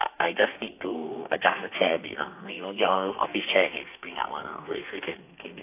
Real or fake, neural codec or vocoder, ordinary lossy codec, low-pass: fake; codec, 32 kHz, 1.9 kbps, SNAC; AAC, 32 kbps; 3.6 kHz